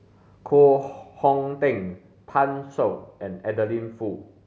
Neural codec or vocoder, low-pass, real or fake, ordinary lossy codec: none; none; real; none